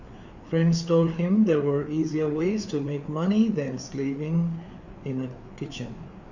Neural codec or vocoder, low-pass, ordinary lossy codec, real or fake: codec, 16 kHz, 4 kbps, FreqCodec, larger model; 7.2 kHz; none; fake